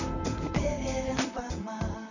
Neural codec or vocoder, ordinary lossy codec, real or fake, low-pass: vocoder, 44.1 kHz, 128 mel bands, Pupu-Vocoder; none; fake; 7.2 kHz